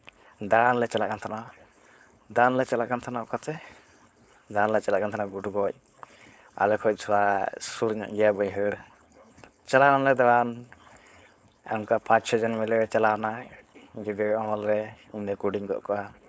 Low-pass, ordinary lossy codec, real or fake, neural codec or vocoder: none; none; fake; codec, 16 kHz, 4.8 kbps, FACodec